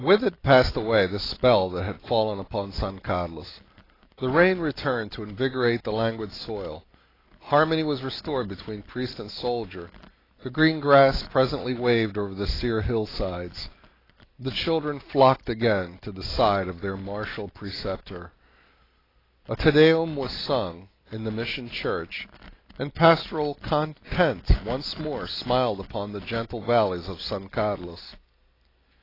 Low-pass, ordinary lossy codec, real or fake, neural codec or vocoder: 5.4 kHz; AAC, 24 kbps; real; none